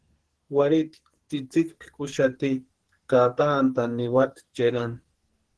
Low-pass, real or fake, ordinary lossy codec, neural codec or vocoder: 10.8 kHz; fake; Opus, 16 kbps; codec, 44.1 kHz, 2.6 kbps, SNAC